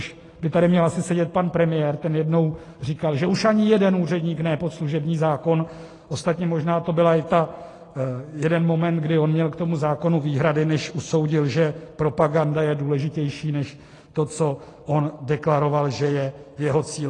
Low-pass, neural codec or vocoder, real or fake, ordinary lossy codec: 10.8 kHz; none; real; AAC, 32 kbps